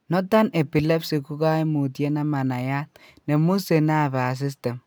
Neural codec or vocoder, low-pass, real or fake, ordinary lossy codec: none; none; real; none